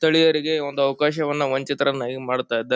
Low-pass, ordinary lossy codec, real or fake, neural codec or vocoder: none; none; real; none